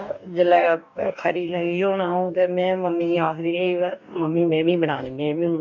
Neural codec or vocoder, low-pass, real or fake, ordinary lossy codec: codec, 44.1 kHz, 2.6 kbps, DAC; 7.2 kHz; fake; none